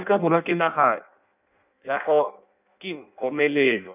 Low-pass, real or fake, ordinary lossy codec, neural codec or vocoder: 3.6 kHz; fake; none; codec, 16 kHz in and 24 kHz out, 0.6 kbps, FireRedTTS-2 codec